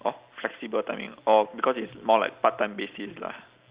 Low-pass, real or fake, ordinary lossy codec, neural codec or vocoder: 3.6 kHz; real; Opus, 64 kbps; none